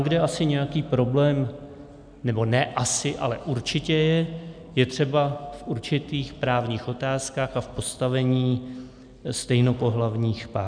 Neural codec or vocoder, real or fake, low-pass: none; real; 9.9 kHz